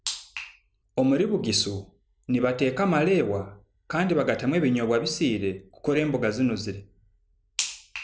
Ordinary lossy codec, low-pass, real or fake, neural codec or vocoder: none; none; real; none